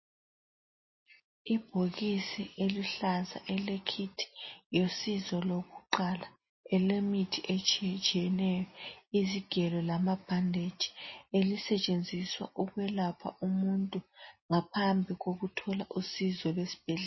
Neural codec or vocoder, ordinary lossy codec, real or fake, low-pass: none; MP3, 24 kbps; real; 7.2 kHz